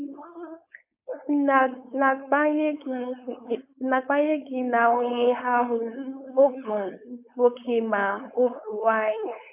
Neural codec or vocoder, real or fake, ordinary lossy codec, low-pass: codec, 16 kHz, 4.8 kbps, FACodec; fake; AAC, 32 kbps; 3.6 kHz